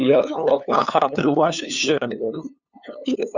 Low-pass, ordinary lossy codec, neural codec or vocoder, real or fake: 7.2 kHz; Opus, 64 kbps; codec, 16 kHz, 2 kbps, FunCodec, trained on LibriTTS, 25 frames a second; fake